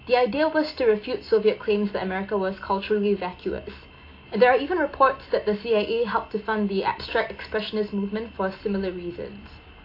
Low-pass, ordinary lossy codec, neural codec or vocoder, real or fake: 5.4 kHz; AAC, 32 kbps; none; real